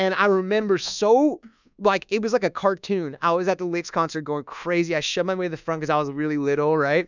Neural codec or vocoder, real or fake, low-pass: codec, 24 kHz, 1.2 kbps, DualCodec; fake; 7.2 kHz